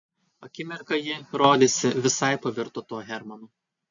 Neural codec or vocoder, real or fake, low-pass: none; real; 7.2 kHz